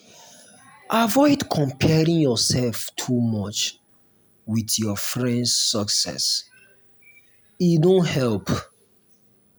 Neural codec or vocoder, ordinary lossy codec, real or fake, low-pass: none; none; real; none